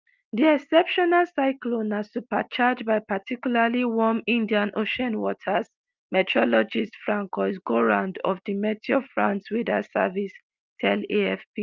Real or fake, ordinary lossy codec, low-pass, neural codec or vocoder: real; Opus, 24 kbps; 7.2 kHz; none